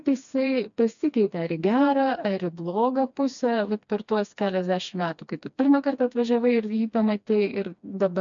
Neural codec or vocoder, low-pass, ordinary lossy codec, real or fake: codec, 16 kHz, 2 kbps, FreqCodec, smaller model; 7.2 kHz; MP3, 64 kbps; fake